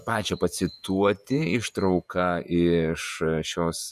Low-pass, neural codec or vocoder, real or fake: 14.4 kHz; vocoder, 48 kHz, 128 mel bands, Vocos; fake